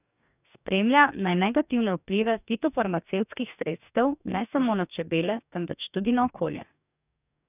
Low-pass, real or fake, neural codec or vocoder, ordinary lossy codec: 3.6 kHz; fake; codec, 44.1 kHz, 2.6 kbps, DAC; none